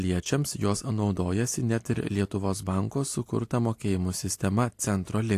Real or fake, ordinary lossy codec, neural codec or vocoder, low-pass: real; AAC, 64 kbps; none; 14.4 kHz